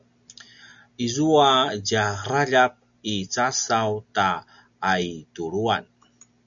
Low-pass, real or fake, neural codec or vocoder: 7.2 kHz; real; none